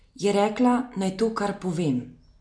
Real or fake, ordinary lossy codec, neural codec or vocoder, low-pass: real; MP3, 64 kbps; none; 9.9 kHz